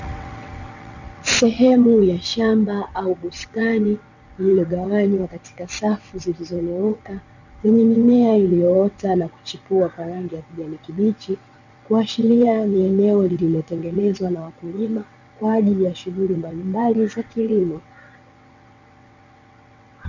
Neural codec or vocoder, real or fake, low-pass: vocoder, 22.05 kHz, 80 mel bands, WaveNeXt; fake; 7.2 kHz